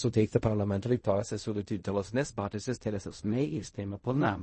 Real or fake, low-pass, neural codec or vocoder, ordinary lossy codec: fake; 10.8 kHz; codec, 16 kHz in and 24 kHz out, 0.4 kbps, LongCat-Audio-Codec, fine tuned four codebook decoder; MP3, 32 kbps